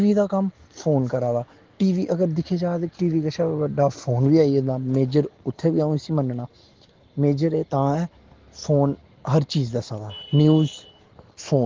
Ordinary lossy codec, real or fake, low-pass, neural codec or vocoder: Opus, 16 kbps; real; 7.2 kHz; none